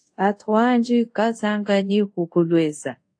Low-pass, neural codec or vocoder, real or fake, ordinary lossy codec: 9.9 kHz; codec, 24 kHz, 0.5 kbps, DualCodec; fake; AAC, 48 kbps